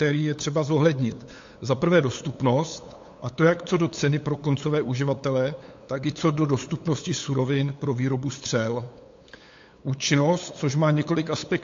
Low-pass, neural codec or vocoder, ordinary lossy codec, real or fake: 7.2 kHz; codec, 16 kHz, 8 kbps, FunCodec, trained on LibriTTS, 25 frames a second; AAC, 48 kbps; fake